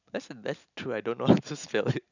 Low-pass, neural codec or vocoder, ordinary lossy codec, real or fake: 7.2 kHz; none; none; real